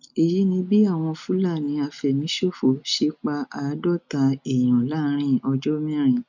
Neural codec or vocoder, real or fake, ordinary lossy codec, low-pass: none; real; none; 7.2 kHz